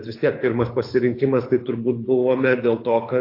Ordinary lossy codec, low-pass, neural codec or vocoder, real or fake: AAC, 32 kbps; 5.4 kHz; codec, 24 kHz, 6 kbps, HILCodec; fake